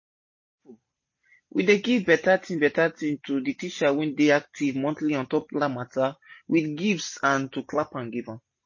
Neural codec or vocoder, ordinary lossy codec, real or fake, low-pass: none; MP3, 32 kbps; real; 7.2 kHz